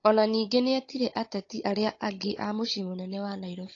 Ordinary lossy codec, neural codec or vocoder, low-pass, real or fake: AAC, 32 kbps; codec, 16 kHz, 16 kbps, FunCodec, trained on Chinese and English, 50 frames a second; 7.2 kHz; fake